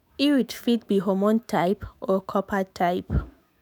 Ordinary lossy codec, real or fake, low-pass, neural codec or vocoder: none; fake; none; autoencoder, 48 kHz, 128 numbers a frame, DAC-VAE, trained on Japanese speech